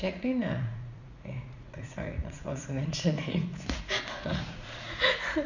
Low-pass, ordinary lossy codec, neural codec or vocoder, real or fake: 7.2 kHz; none; vocoder, 44.1 kHz, 80 mel bands, Vocos; fake